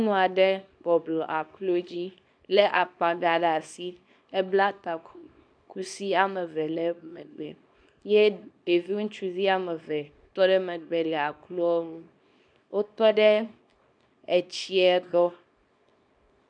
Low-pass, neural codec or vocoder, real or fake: 9.9 kHz; codec, 24 kHz, 0.9 kbps, WavTokenizer, small release; fake